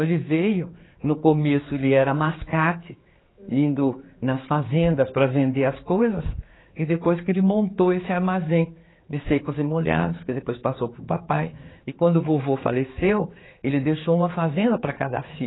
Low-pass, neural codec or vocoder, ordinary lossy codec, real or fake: 7.2 kHz; codec, 16 kHz, 4 kbps, X-Codec, HuBERT features, trained on general audio; AAC, 16 kbps; fake